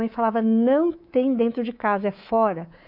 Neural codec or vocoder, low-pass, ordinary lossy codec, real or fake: codec, 24 kHz, 3.1 kbps, DualCodec; 5.4 kHz; none; fake